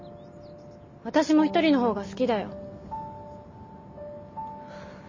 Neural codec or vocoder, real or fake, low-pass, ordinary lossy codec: none; real; 7.2 kHz; MP3, 32 kbps